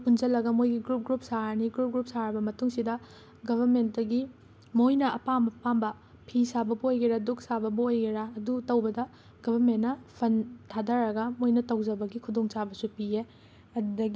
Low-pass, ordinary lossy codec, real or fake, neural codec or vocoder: none; none; real; none